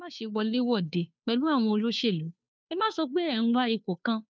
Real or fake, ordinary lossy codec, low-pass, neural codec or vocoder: fake; none; none; codec, 16 kHz, 2 kbps, FunCodec, trained on Chinese and English, 25 frames a second